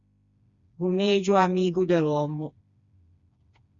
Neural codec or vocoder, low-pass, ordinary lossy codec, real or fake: codec, 16 kHz, 2 kbps, FreqCodec, smaller model; 7.2 kHz; Opus, 64 kbps; fake